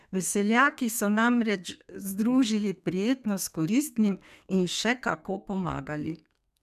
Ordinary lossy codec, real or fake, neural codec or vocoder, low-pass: none; fake; codec, 32 kHz, 1.9 kbps, SNAC; 14.4 kHz